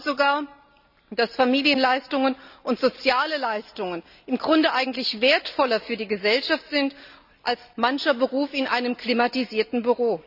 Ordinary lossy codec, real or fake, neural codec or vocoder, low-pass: none; real; none; 5.4 kHz